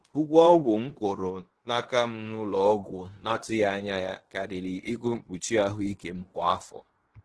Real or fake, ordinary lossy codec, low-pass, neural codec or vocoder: fake; Opus, 16 kbps; 10.8 kHz; codec, 16 kHz in and 24 kHz out, 0.9 kbps, LongCat-Audio-Codec, fine tuned four codebook decoder